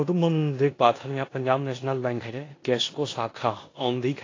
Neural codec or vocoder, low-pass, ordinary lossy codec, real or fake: codec, 16 kHz in and 24 kHz out, 0.9 kbps, LongCat-Audio-Codec, four codebook decoder; 7.2 kHz; AAC, 32 kbps; fake